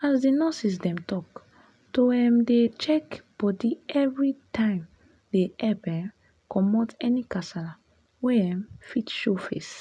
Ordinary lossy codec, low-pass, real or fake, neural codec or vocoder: none; none; real; none